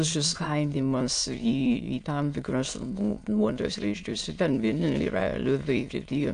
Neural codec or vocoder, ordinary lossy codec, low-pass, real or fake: autoencoder, 22.05 kHz, a latent of 192 numbers a frame, VITS, trained on many speakers; AAC, 64 kbps; 9.9 kHz; fake